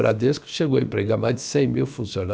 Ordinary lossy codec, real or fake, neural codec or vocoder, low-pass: none; fake; codec, 16 kHz, about 1 kbps, DyCAST, with the encoder's durations; none